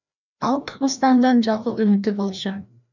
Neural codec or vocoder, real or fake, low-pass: codec, 16 kHz, 1 kbps, FreqCodec, larger model; fake; 7.2 kHz